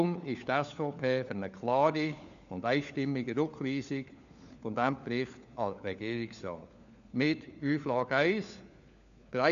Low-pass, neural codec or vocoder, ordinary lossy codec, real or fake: 7.2 kHz; codec, 16 kHz, 4 kbps, FunCodec, trained on LibriTTS, 50 frames a second; AAC, 96 kbps; fake